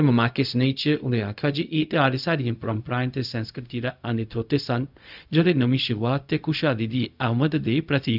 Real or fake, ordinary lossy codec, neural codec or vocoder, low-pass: fake; none; codec, 16 kHz, 0.4 kbps, LongCat-Audio-Codec; 5.4 kHz